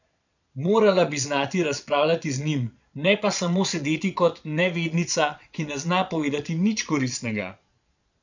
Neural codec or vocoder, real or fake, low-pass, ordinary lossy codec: vocoder, 22.05 kHz, 80 mel bands, Vocos; fake; 7.2 kHz; none